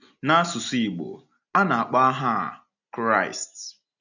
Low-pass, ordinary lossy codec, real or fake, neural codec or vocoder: 7.2 kHz; none; real; none